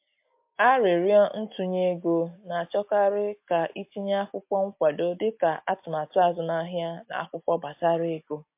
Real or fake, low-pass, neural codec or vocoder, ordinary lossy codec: real; 3.6 kHz; none; MP3, 32 kbps